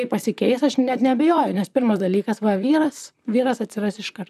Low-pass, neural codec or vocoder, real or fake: 14.4 kHz; vocoder, 44.1 kHz, 128 mel bands, Pupu-Vocoder; fake